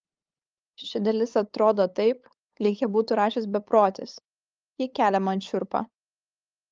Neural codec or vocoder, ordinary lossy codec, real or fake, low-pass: codec, 16 kHz, 8 kbps, FunCodec, trained on LibriTTS, 25 frames a second; Opus, 24 kbps; fake; 7.2 kHz